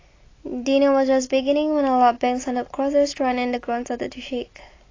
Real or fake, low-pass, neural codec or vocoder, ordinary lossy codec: real; 7.2 kHz; none; AAC, 32 kbps